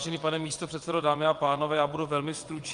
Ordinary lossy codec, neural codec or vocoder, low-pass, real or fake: Opus, 32 kbps; vocoder, 22.05 kHz, 80 mel bands, WaveNeXt; 9.9 kHz; fake